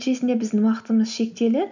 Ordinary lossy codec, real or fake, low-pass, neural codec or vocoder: none; real; 7.2 kHz; none